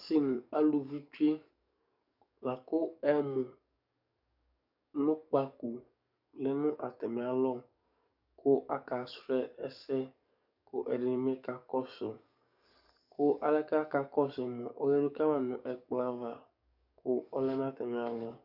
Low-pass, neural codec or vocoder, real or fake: 5.4 kHz; codec, 44.1 kHz, 7.8 kbps, DAC; fake